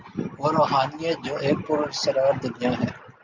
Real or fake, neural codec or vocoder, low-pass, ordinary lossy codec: real; none; 7.2 kHz; Opus, 64 kbps